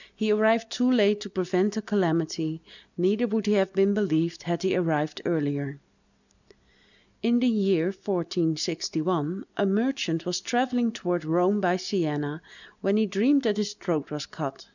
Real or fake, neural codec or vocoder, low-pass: real; none; 7.2 kHz